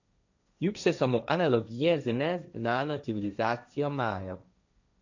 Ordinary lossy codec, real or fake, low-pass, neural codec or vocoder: none; fake; 7.2 kHz; codec, 16 kHz, 1.1 kbps, Voila-Tokenizer